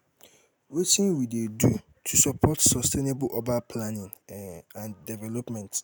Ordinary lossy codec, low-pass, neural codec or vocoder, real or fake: none; none; none; real